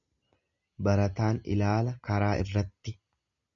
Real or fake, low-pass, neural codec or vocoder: real; 7.2 kHz; none